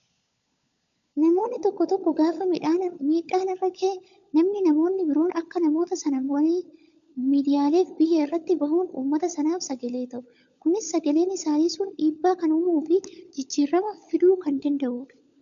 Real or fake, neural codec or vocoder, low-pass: fake; codec, 16 kHz, 16 kbps, FunCodec, trained on LibriTTS, 50 frames a second; 7.2 kHz